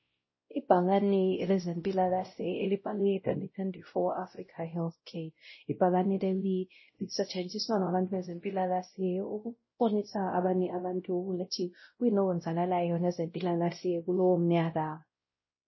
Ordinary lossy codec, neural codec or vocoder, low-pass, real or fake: MP3, 24 kbps; codec, 16 kHz, 0.5 kbps, X-Codec, WavLM features, trained on Multilingual LibriSpeech; 7.2 kHz; fake